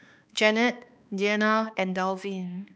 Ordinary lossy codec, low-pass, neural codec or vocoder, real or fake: none; none; codec, 16 kHz, 2 kbps, X-Codec, HuBERT features, trained on balanced general audio; fake